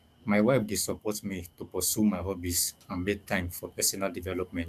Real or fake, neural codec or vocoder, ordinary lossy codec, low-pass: fake; codec, 44.1 kHz, 7.8 kbps, DAC; none; 14.4 kHz